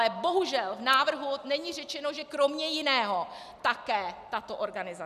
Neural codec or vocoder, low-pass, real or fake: none; 14.4 kHz; real